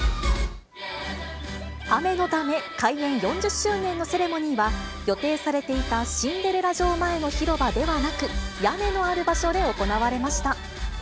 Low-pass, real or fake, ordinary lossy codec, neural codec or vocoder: none; real; none; none